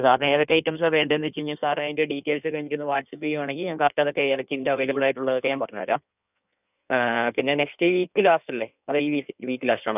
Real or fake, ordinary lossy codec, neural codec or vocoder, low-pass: fake; none; codec, 16 kHz in and 24 kHz out, 1.1 kbps, FireRedTTS-2 codec; 3.6 kHz